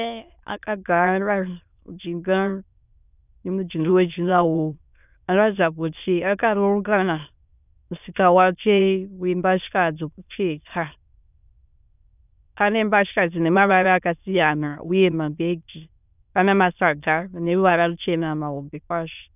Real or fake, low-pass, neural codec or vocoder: fake; 3.6 kHz; autoencoder, 22.05 kHz, a latent of 192 numbers a frame, VITS, trained on many speakers